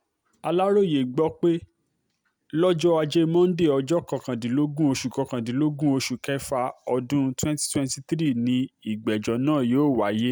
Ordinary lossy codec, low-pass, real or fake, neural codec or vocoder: none; none; real; none